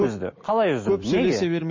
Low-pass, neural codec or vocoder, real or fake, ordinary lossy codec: 7.2 kHz; none; real; MP3, 32 kbps